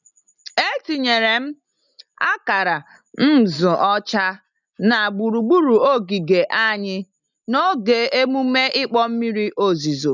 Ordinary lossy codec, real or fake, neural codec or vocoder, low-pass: none; real; none; 7.2 kHz